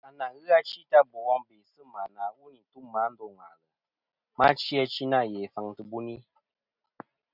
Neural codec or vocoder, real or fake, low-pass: none; real; 5.4 kHz